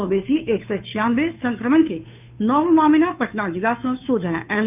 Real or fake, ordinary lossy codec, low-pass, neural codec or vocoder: fake; none; 3.6 kHz; codec, 16 kHz, 2 kbps, FunCodec, trained on Chinese and English, 25 frames a second